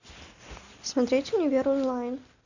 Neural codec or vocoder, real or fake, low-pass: none; real; 7.2 kHz